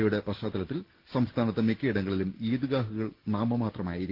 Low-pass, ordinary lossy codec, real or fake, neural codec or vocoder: 5.4 kHz; Opus, 16 kbps; real; none